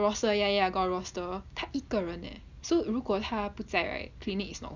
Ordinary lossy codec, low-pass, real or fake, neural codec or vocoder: none; 7.2 kHz; real; none